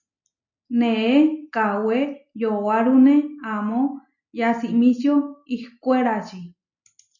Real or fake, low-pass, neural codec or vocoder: real; 7.2 kHz; none